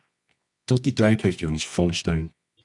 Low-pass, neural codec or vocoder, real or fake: 10.8 kHz; codec, 24 kHz, 0.9 kbps, WavTokenizer, medium music audio release; fake